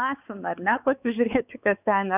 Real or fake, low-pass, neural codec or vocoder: fake; 3.6 kHz; codec, 16 kHz, 4 kbps, X-Codec, WavLM features, trained on Multilingual LibriSpeech